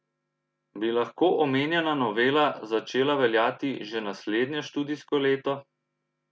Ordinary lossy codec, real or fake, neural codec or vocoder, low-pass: none; real; none; none